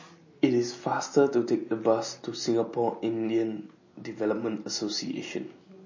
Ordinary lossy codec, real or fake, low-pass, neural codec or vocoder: MP3, 32 kbps; real; 7.2 kHz; none